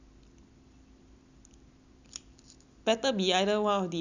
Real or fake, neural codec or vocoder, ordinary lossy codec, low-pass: real; none; none; 7.2 kHz